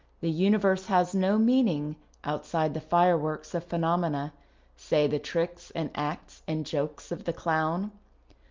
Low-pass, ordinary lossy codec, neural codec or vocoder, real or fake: 7.2 kHz; Opus, 24 kbps; none; real